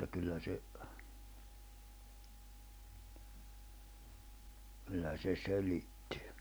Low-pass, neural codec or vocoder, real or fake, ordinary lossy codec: none; none; real; none